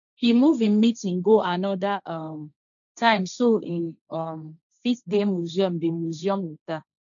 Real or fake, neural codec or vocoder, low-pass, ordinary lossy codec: fake; codec, 16 kHz, 1.1 kbps, Voila-Tokenizer; 7.2 kHz; none